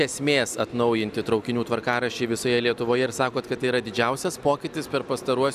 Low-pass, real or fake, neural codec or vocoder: 14.4 kHz; real; none